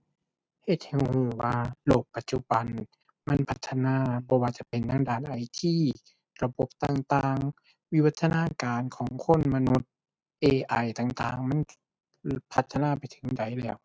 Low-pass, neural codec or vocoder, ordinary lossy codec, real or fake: none; none; none; real